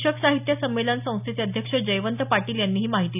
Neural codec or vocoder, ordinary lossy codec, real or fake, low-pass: none; none; real; 3.6 kHz